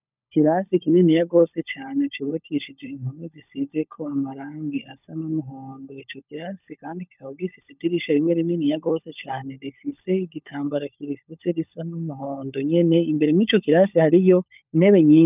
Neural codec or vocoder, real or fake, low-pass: codec, 16 kHz, 16 kbps, FunCodec, trained on LibriTTS, 50 frames a second; fake; 3.6 kHz